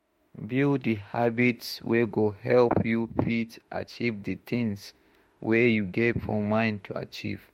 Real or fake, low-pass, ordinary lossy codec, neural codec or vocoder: fake; 19.8 kHz; MP3, 64 kbps; autoencoder, 48 kHz, 32 numbers a frame, DAC-VAE, trained on Japanese speech